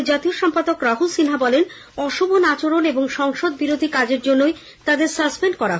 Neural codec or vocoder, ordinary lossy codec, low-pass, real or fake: none; none; none; real